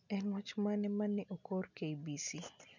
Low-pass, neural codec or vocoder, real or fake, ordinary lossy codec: 7.2 kHz; none; real; none